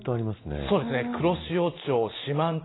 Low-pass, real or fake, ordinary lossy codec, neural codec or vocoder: 7.2 kHz; real; AAC, 16 kbps; none